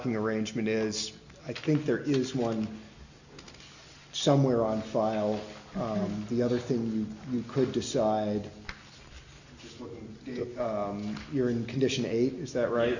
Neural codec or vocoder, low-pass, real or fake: none; 7.2 kHz; real